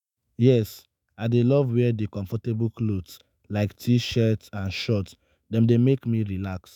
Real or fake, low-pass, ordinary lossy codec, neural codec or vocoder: fake; 19.8 kHz; none; autoencoder, 48 kHz, 128 numbers a frame, DAC-VAE, trained on Japanese speech